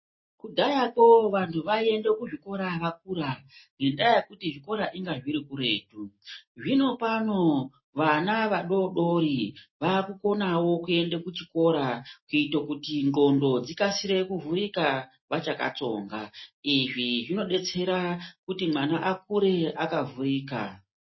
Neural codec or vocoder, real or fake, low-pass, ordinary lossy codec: none; real; 7.2 kHz; MP3, 24 kbps